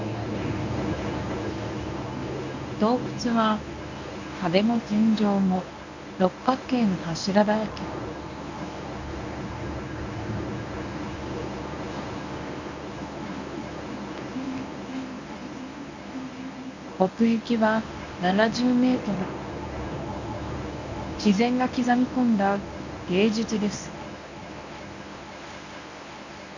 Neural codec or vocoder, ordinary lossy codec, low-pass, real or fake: codec, 24 kHz, 0.9 kbps, WavTokenizer, medium speech release version 1; none; 7.2 kHz; fake